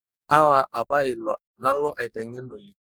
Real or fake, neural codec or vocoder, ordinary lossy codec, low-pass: fake; codec, 44.1 kHz, 2.6 kbps, DAC; none; none